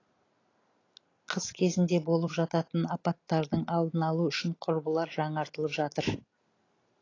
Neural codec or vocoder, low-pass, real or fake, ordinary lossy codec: none; 7.2 kHz; real; AAC, 32 kbps